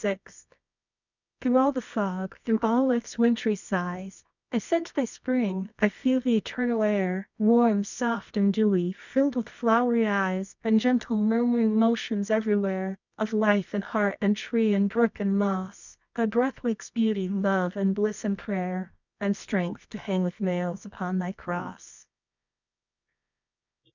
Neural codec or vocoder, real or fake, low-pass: codec, 24 kHz, 0.9 kbps, WavTokenizer, medium music audio release; fake; 7.2 kHz